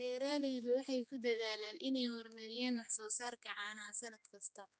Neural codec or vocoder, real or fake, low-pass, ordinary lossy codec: codec, 16 kHz, 2 kbps, X-Codec, HuBERT features, trained on general audio; fake; none; none